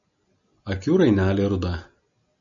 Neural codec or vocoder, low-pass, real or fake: none; 7.2 kHz; real